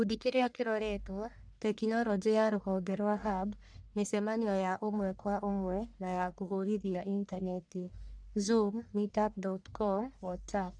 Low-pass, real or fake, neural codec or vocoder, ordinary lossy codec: 9.9 kHz; fake; codec, 44.1 kHz, 1.7 kbps, Pupu-Codec; none